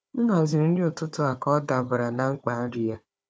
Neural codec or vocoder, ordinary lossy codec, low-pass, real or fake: codec, 16 kHz, 4 kbps, FunCodec, trained on Chinese and English, 50 frames a second; none; none; fake